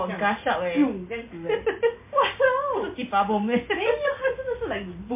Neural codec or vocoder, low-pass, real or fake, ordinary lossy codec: none; 3.6 kHz; real; MP3, 24 kbps